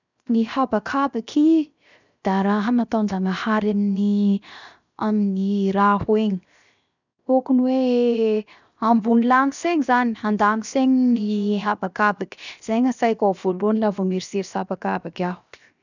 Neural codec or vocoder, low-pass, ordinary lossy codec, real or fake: codec, 16 kHz, 0.7 kbps, FocalCodec; 7.2 kHz; none; fake